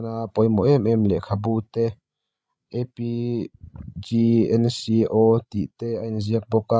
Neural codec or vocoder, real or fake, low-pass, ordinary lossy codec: codec, 16 kHz, 16 kbps, FreqCodec, larger model; fake; none; none